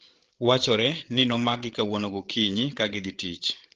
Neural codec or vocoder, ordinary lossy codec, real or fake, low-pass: codec, 16 kHz, 8 kbps, FreqCodec, larger model; Opus, 16 kbps; fake; 7.2 kHz